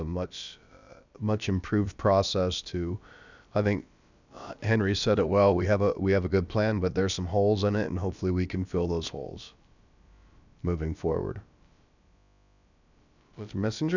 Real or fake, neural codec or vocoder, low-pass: fake; codec, 16 kHz, about 1 kbps, DyCAST, with the encoder's durations; 7.2 kHz